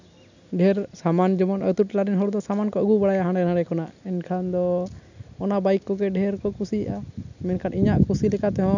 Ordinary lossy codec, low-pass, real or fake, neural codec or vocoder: none; 7.2 kHz; real; none